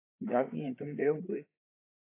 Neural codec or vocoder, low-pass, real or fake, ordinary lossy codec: codec, 16 kHz, 8 kbps, FreqCodec, larger model; 3.6 kHz; fake; AAC, 24 kbps